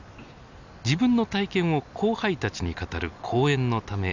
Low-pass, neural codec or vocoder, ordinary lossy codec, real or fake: 7.2 kHz; none; none; real